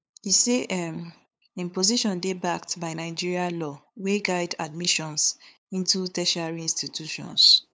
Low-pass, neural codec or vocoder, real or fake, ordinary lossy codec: none; codec, 16 kHz, 8 kbps, FunCodec, trained on LibriTTS, 25 frames a second; fake; none